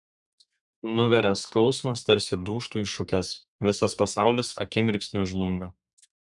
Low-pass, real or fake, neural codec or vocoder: 10.8 kHz; fake; codec, 32 kHz, 1.9 kbps, SNAC